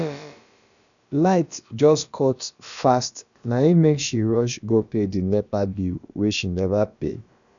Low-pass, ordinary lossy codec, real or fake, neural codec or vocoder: 7.2 kHz; none; fake; codec, 16 kHz, about 1 kbps, DyCAST, with the encoder's durations